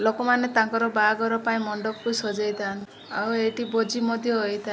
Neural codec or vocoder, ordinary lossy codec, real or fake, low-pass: none; none; real; none